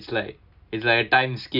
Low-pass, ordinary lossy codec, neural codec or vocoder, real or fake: 5.4 kHz; none; none; real